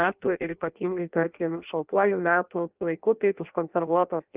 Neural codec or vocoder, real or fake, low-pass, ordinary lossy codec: codec, 16 kHz in and 24 kHz out, 0.6 kbps, FireRedTTS-2 codec; fake; 3.6 kHz; Opus, 24 kbps